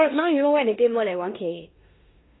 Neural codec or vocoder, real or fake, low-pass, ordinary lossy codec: codec, 16 kHz in and 24 kHz out, 0.9 kbps, LongCat-Audio-Codec, four codebook decoder; fake; 7.2 kHz; AAC, 16 kbps